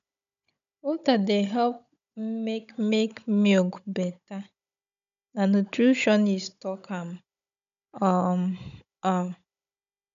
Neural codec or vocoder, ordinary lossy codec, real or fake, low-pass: codec, 16 kHz, 16 kbps, FunCodec, trained on Chinese and English, 50 frames a second; none; fake; 7.2 kHz